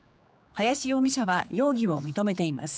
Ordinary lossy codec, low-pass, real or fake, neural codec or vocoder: none; none; fake; codec, 16 kHz, 4 kbps, X-Codec, HuBERT features, trained on general audio